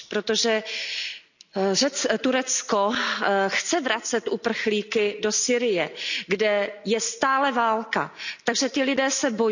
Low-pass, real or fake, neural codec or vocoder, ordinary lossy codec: 7.2 kHz; real; none; none